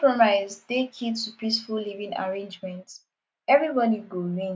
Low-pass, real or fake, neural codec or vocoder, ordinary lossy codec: none; real; none; none